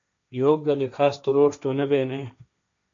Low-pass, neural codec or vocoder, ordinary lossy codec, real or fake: 7.2 kHz; codec, 16 kHz, 1.1 kbps, Voila-Tokenizer; MP3, 64 kbps; fake